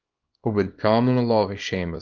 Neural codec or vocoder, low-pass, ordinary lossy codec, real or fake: codec, 24 kHz, 0.9 kbps, WavTokenizer, small release; 7.2 kHz; Opus, 24 kbps; fake